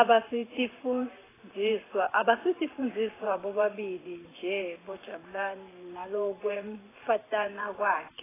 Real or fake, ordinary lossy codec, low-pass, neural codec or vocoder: fake; AAC, 16 kbps; 3.6 kHz; vocoder, 44.1 kHz, 128 mel bands every 512 samples, BigVGAN v2